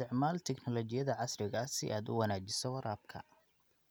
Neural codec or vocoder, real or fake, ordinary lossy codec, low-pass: none; real; none; none